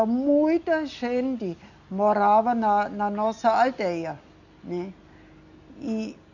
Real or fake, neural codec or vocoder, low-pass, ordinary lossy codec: real; none; 7.2 kHz; none